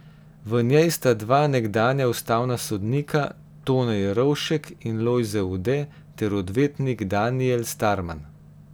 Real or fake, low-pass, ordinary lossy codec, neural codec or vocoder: real; none; none; none